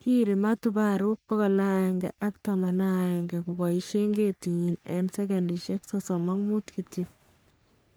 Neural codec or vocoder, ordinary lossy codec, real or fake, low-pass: codec, 44.1 kHz, 3.4 kbps, Pupu-Codec; none; fake; none